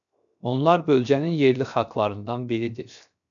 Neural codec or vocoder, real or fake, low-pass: codec, 16 kHz, 0.7 kbps, FocalCodec; fake; 7.2 kHz